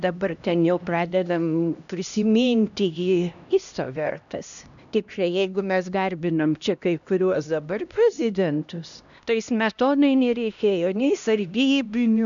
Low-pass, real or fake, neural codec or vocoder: 7.2 kHz; fake; codec, 16 kHz, 1 kbps, X-Codec, HuBERT features, trained on LibriSpeech